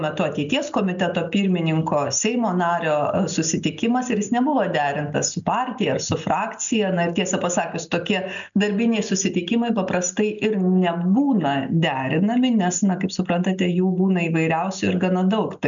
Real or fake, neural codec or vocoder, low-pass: real; none; 7.2 kHz